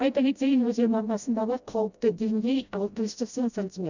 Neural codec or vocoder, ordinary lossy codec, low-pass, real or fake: codec, 16 kHz, 0.5 kbps, FreqCodec, smaller model; none; 7.2 kHz; fake